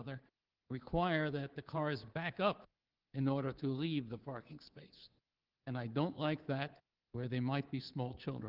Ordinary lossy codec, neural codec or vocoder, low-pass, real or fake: Opus, 16 kbps; codec, 24 kHz, 3.1 kbps, DualCodec; 5.4 kHz; fake